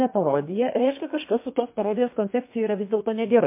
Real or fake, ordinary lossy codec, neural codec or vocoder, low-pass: fake; MP3, 24 kbps; codec, 32 kHz, 1.9 kbps, SNAC; 3.6 kHz